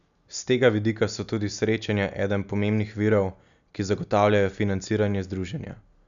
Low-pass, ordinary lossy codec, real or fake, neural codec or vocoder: 7.2 kHz; none; real; none